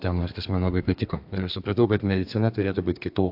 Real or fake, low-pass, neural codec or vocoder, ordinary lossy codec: fake; 5.4 kHz; codec, 32 kHz, 1.9 kbps, SNAC; AAC, 48 kbps